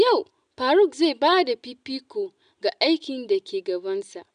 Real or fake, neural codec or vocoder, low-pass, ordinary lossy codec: real; none; 9.9 kHz; none